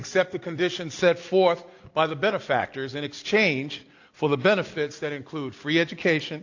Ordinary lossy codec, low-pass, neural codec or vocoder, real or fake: AAC, 48 kbps; 7.2 kHz; none; real